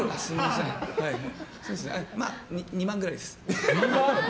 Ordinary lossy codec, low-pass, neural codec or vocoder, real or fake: none; none; none; real